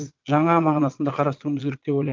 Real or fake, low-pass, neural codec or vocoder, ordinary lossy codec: fake; 7.2 kHz; vocoder, 22.05 kHz, 80 mel bands, Vocos; Opus, 24 kbps